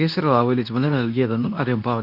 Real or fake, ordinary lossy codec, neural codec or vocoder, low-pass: fake; none; codec, 24 kHz, 0.9 kbps, WavTokenizer, medium speech release version 2; 5.4 kHz